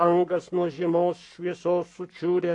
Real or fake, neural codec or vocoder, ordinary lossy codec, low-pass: fake; codec, 44.1 kHz, 7.8 kbps, Pupu-Codec; AAC, 48 kbps; 10.8 kHz